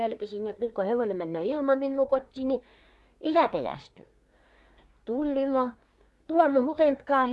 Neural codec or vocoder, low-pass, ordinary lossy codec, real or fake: codec, 24 kHz, 1 kbps, SNAC; none; none; fake